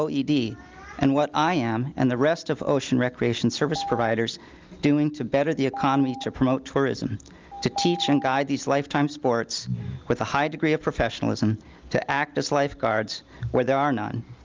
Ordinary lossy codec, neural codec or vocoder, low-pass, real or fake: Opus, 32 kbps; none; 7.2 kHz; real